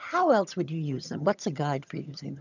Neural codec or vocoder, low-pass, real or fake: vocoder, 22.05 kHz, 80 mel bands, HiFi-GAN; 7.2 kHz; fake